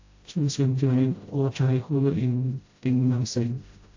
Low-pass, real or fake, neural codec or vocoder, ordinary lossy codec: 7.2 kHz; fake; codec, 16 kHz, 0.5 kbps, FreqCodec, smaller model; AAC, 48 kbps